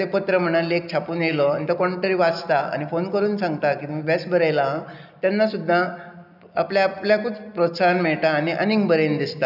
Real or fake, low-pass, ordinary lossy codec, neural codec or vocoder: real; 5.4 kHz; none; none